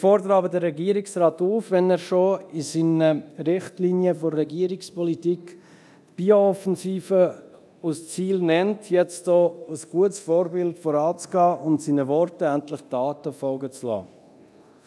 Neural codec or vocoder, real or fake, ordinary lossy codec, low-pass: codec, 24 kHz, 0.9 kbps, DualCodec; fake; none; none